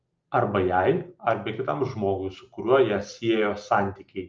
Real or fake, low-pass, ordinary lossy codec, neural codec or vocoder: real; 7.2 kHz; Opus, 24 kbps; none